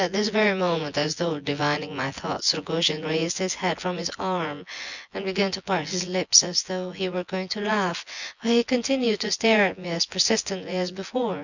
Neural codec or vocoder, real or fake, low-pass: vocoder, 24 kHz, 100 mel bands, Vocos; fake; 7.2 kHz